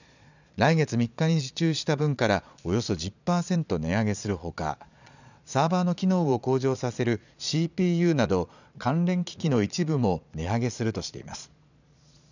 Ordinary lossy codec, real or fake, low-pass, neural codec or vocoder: none; fake; 7.2 kHz; vocoder, 22.05 kHz, 80 mel bands, Vocos